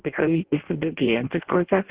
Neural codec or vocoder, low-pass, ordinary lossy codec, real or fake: codec, 16 kHz in and 24 kHz out, 0.6 kbps, FireRedTTS-2 codec; 3.6 kHz; Opus, 16 kbps; fake